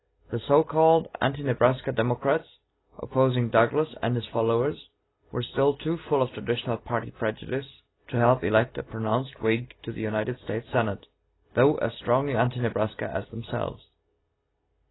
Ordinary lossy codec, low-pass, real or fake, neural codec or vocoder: AAC, 16 kbps; 7.2 kHz; real; none